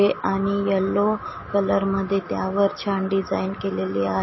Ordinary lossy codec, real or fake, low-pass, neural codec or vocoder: MP3, 24 kbps; real; 7.2 kHz; none